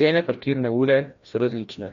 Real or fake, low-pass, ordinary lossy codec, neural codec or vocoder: fake; 7.2 kHz; MP3, 48 kbps; codec, 16 kHz, 1 kbps, FreqCodec, larger model